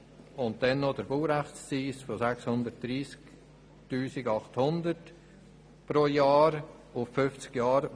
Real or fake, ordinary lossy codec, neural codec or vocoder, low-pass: real; none; none; none